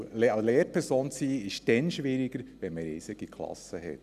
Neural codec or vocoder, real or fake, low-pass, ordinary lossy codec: none; real; 14.4 kHz; none